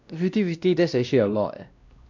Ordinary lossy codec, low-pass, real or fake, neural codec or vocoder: none; 7.2 kHz; fake; codec, 16 kHz, 1 kbps, X-Codec, WavLM features, trained on Multilingual LibriSpeech